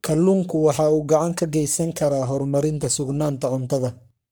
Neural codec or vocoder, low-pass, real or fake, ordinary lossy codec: codec, 44.1 kHz, 3.4 kbps, Pupu-Codec; none; fake; none